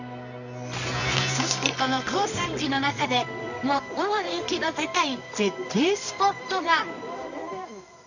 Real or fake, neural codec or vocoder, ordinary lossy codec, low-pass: fake; codec, 24 kHz, 0.9 kbps, WavTokenizer, medium music audio release; none; 7.2 kHz